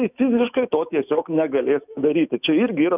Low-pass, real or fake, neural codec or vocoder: 3.6 kHz; fake; autoencoder, 48 kHz, 128 numbers a frame, DAC-VAE, trained on Japanese speech